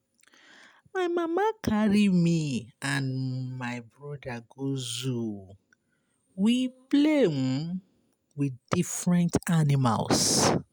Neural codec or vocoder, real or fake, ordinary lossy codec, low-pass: none; real; none; none